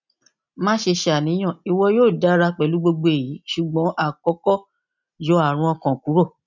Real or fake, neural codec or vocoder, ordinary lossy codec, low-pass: real; none; none; 7.2 kHz